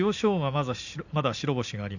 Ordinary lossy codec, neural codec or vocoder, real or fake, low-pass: none; none; real; 7.2 kHz